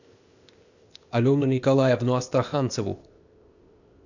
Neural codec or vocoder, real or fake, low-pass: codec, 16 kHz, 0.8 kbps, ZipCodec; fake; 7.2 kHz